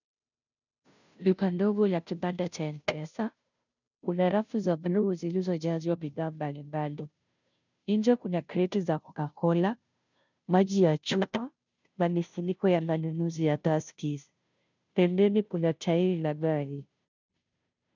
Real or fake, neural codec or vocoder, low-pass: fake; codec, 16 kHz, 0.5 kbps, FunCodec, trained on Chinese and English, 25 frames a second; 7.2 kHz